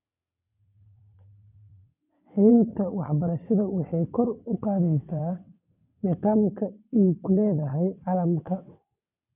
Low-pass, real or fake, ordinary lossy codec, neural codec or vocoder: 3.6 kHz; fake; none; vocoder, 22.05 kHz, 80 mel bands, WaveNeXt